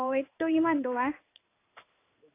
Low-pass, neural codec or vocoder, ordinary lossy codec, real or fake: 3.6 kHz; codec, 16 kHz in and 24 kHz out, 1 kbps, XY-Tokenizer; AAC, 24 kbps; fake